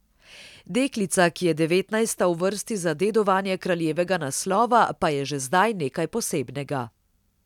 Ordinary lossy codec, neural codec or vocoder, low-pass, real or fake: none; none; 19.8 kHz; real